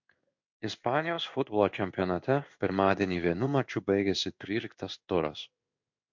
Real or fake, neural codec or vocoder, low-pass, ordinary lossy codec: fake; codec, 16 kHz in and 24 kHz out, 1 kbps, XY-Tokenizer; 7.2 kHz; MP3, 48 kbps